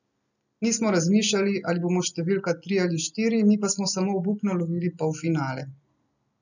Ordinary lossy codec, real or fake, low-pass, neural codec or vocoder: none; real; 7.2 kHz; none